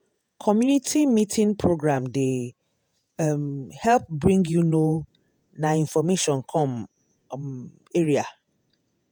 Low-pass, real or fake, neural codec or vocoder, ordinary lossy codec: none; fake; vocoder, 48 kHz, 128 mel bands, Vocos; none